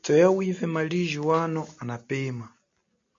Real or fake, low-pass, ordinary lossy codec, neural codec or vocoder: real; 7.2 kHz; MP3, 48 kbps; none